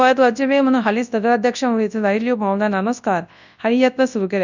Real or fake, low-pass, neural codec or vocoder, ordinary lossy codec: fake; 7.2 kHz; codec, 24 kHz, 0.9 kbps, WavTokenizer, large speech release; none